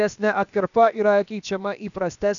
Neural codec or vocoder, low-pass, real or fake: codec, 16 kHz, about 1 kbps, DyCAST, with the encoder's durations; 7.2 kHz; fake